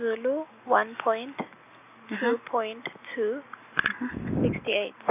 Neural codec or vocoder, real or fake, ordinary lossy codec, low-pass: none; real; none; 3.6 kHz